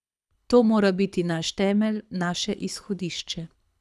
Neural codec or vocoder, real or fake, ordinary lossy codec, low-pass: codec, 24 kHz, 6 kbps, HILCodec; fake; none; none